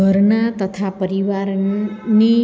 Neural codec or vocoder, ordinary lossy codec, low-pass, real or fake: none; none; none; real